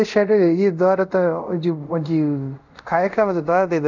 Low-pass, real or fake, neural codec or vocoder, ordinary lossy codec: 7.2 kHz; fake; codec, 24 kHz, 0.5 kbps, DualCodec; none